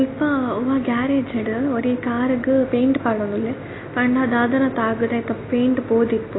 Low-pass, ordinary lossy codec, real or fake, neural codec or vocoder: 7.2 kHz; AAC, 16 kbps; real; none